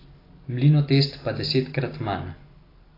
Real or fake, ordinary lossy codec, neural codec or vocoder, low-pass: real; AAC, 24 kbps; none; 5.4 kHz